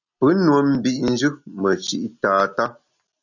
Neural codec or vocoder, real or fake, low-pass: none; real; 7.2 kHz